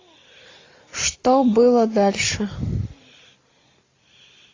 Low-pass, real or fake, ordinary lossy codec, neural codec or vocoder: 7.2 kHz; real; AAC, 32 kbps; none